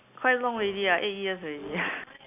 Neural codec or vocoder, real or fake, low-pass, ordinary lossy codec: none; real; 3.6 kHz; none